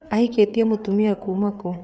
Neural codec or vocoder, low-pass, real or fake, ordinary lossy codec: codec, 16 kHz, 4 kbps, FreqCodec, larger model; none; fake; none